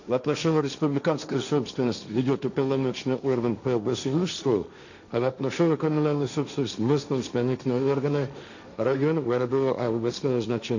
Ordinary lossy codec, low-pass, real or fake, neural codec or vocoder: none; 7.2 kHz; fake; codec, 16 kHz, 1.1 kbps, Voila-Tokenizer